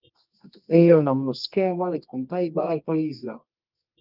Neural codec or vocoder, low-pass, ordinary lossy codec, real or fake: codec, 24 kHz, 0.9 kbps, WavTokenizer, medium music audio release; 5.4 kHz; Opus, 24 kbps; fake